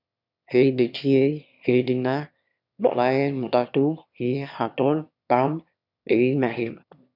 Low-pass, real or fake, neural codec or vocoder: 5.4 kHz; fake; autoencoder, 22.05 kHz, a latent of 192 numbers a frame, VITS, trained on one speaker